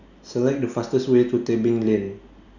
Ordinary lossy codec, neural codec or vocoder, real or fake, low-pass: none; none; real; 7.2 kHz